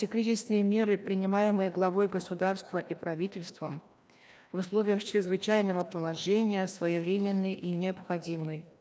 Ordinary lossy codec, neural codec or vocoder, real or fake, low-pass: none; codec, 16 kHz, 1 kbps, FreqCodec, larger model; fake; none